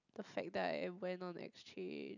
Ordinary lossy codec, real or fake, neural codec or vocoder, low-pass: none; real; none; 7.2 kHz